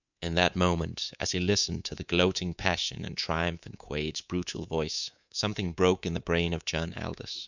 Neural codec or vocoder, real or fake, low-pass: codec, 24 kHz, 3.1 kbps, DualCodec; fake; 7.2 kHz